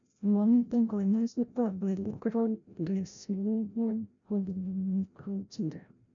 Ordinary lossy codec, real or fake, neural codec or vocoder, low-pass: none; fake; codec, 16 kHz, 0.5 kbps, FreqCodec, larger model; 7.2 kHz